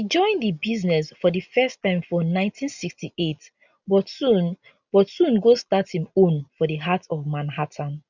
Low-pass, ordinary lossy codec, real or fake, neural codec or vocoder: 7.2 kHz; none; real; none